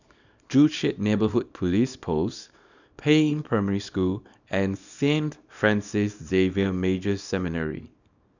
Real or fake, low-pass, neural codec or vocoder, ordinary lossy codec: fake; 7.2 kHz; codec, 24 kHz, 0.9 kbps, WavTokenizer, small release; none